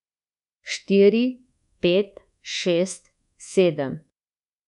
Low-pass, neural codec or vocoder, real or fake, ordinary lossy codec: 10.8 kHz; codec, 24 kHz, 1.2 kbps, DualCodec; fake; none